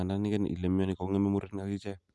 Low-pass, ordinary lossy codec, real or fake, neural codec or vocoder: none; none; real; none